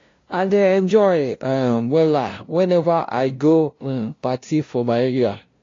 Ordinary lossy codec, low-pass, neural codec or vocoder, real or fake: AAC, 32 kbps; 7.2 kHz; codec, 16 kHz, 0.5 kbps, FunCodec, trained on LibriTTS, 25 frames a second; fake